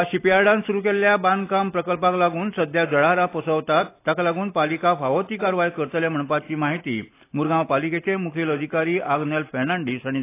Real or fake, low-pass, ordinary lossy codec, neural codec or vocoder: real; 3.6 kHz; AAC, 24 kbps; none